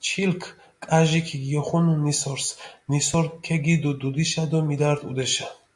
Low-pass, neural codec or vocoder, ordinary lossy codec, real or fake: 10.8 kHz; none; MP3, 64 kbps; real